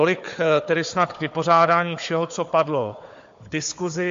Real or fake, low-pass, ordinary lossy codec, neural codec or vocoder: fake; 7.2 kHz; MP3, 48 kbps; codec, 16 kHz, 4 kbps, FunCodec, trained on Chinese and English, 50 frames a second